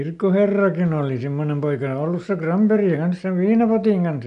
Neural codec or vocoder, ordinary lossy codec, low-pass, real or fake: none; none; 10.8 kHz; real